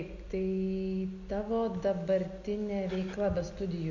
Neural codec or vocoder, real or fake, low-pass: none; real; 7.2 kHz